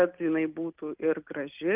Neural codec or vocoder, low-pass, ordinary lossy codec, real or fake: none; 3.6 kHz; Opus, 32 kbps; real